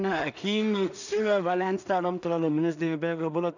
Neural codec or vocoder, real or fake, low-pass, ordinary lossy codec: codec, 16 kHz in and 24 kHz out, 0.4 kbps, LongCat-Audio-Codec, two codebook decoder; fake; 7.2 kHz; none